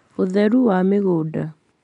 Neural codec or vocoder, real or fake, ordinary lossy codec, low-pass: none; real; MP3, 96 kbps; 10.8 kHz